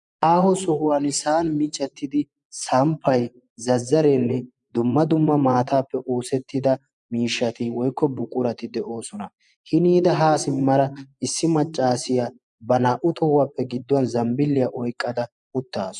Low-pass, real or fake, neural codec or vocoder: 10.8 kHz; fake; vocoder, 24 kHz, 100 mel bands, Vocos